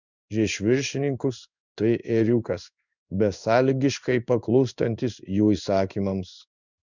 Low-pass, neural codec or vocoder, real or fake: 7.2 kHz; codec, 16 kHz in and 24 kHz out, 1 kbps, XY-Tokenizer; fake